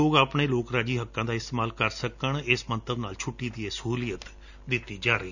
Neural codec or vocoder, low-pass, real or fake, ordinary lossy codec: none; 7.2 kHz; real; none